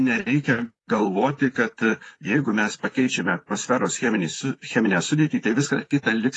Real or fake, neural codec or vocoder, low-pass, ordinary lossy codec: fake; vocoder, 44.1 kHz, 128 mel bands, Pupu-Vocoder; 10.8 kHz; AAC, 32 kbps